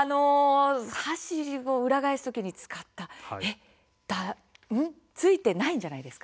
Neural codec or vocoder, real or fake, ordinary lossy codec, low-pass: none; real; none; none